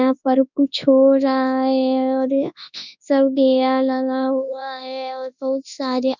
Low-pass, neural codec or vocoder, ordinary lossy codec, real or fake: 7.2 kHz; codec, 24 kHz, 0.9 kbps, WavTokenizer, large speech release; none; fake